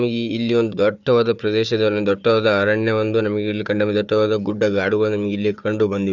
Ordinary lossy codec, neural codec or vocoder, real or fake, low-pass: none; codec, 16 kHz, 4 kbps, FunCodec, trained on Chinese and English, 50 frames a second; fake; 7.2 kHz